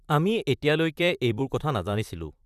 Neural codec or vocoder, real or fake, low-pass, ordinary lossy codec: vocoder, 44.1 kHz, 128 mel bands, Pupu-Vocoder; fake; 14.4 kHz; none